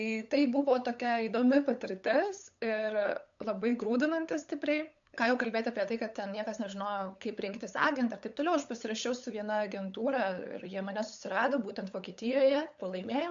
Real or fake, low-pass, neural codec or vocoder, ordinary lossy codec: fake; 7.2 kHz; codec, 16 kHz, 8 kbps, FunCodec, trained on LibriTTS, 25 frames a second; AAC, 64 kbps